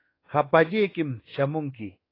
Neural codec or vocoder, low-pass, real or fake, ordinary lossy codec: codec, 24 kHz, 1.2 kbps, DualCodec; 5.4 kHz; fake; AAC, 24 kbps